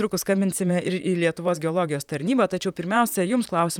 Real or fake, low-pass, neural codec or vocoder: fake; 19.8 kHz; vocoder, 44.1 kHz, 128 mel bands, Pupu-Vocoder